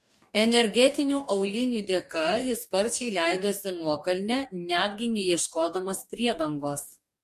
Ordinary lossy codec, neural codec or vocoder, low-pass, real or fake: MP3, 64 kbps; codec, 44.1 kHz, 2.6 kbps, DAC; 14.4 kHz; fake